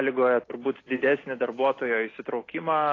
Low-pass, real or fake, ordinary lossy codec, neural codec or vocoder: 7.2 kHz; real; AAC, 32 kbps; none